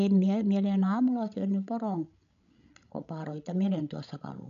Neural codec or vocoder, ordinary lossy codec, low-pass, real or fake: codec, 16 kHz, 16 kbps, FreqCodec, larger model; none; 7.2 kHz; fake